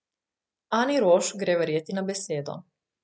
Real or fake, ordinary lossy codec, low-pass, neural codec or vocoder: real; none; none; none